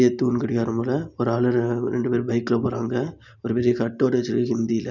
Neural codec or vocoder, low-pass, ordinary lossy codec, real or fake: none; 7.2 kHz; none; real